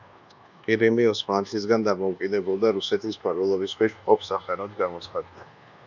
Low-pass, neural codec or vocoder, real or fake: 7.2 kHz; codec, 24 kHz, 1.2 kbps, DualCodec; fake